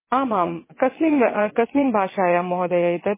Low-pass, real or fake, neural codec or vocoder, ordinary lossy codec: 3.6 kHz; fake; vocoder, 22.05 kHz, 80 mel bands, WaveNeXt; MP3, 16 kbps